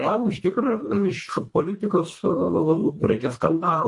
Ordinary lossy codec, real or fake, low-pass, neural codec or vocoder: MP3, 48 kbps; fake; 10.8 kHz; codec, 24 kHz, 1.5 kbps, HILCodec